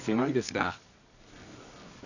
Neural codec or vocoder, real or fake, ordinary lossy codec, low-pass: codec, 24 kHz, 0.9 kbps, WavTokenizer, medium music audio release; fake; none; 7.2 kHz